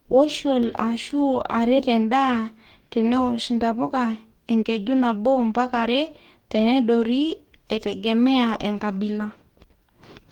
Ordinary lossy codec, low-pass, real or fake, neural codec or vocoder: Opus, 24 kbps; 19.8 kHz; fake; codec, 44.1 kHz, 2.6 kbps, DAC